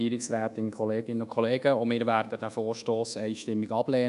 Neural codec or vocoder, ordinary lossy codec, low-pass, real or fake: codec, 24 kHz, 1.2 kbps, DualCodec; none; 10.8 kHz; fake